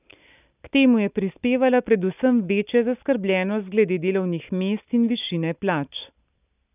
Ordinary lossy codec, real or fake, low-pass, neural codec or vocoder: none; real; 3.6 kHz; none